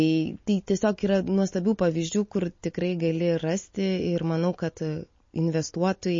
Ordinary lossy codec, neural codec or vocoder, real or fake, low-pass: MP3, 32 kbps; none; real; 7.2 kHz